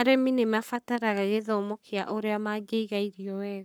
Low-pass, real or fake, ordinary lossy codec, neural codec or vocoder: none; fake; none; codec, 44.1 kHz, 7.8 kbps, Pupu-Codec